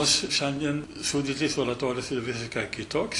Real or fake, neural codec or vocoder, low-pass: real; none; 10.8 kHz